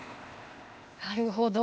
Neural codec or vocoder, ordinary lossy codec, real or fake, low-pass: codec, 16 kHz, 0.8 kbps, ZipCodec; none; fake; none